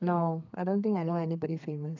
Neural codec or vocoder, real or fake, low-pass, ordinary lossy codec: codec, 32 kHz, 1.9 kbps, SNAC; fake; 7.2 kHz; none